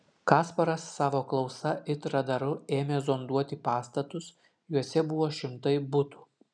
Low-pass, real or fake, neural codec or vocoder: 9.9 kHz; real; none